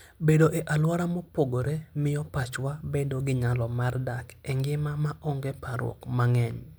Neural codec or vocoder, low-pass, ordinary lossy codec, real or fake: none; none; none; real